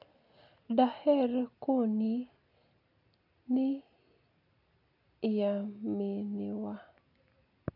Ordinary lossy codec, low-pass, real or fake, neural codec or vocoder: none; 5.4 kHz; real; none